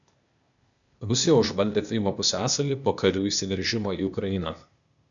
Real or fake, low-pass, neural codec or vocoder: fake; 7.2 kHz; codec, 16 kHz, 0.8 kbps, ZipCodec